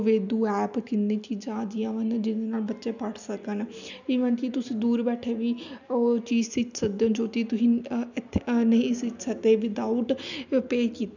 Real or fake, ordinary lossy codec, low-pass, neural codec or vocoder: real; none; 7.2 kHz; none